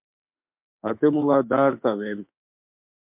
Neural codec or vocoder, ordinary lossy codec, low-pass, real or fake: autoencoder, 48 kHz, 32 numbers a frame, DAC-VAE, trained on Japanese speech; MP3, 32 kbps; 3.6 kHz; fake